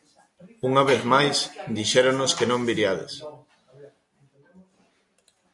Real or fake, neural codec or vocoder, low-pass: real; none; 10.8 kHz